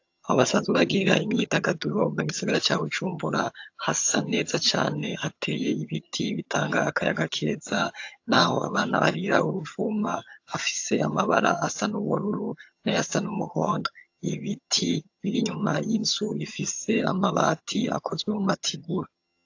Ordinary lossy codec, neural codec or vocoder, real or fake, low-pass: AAC, 48 kbps; vocoder, 22.05 kHz, 80 mel bands, HiFi-GAN; fake; 7.2 kHz